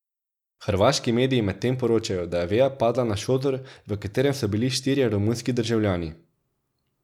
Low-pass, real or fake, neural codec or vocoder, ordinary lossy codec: 19.8 kHz; real; none; none